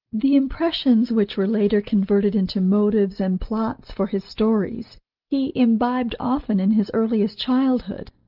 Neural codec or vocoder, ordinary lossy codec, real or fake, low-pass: none; Opus, 32 kbps; real; 5.4 kHz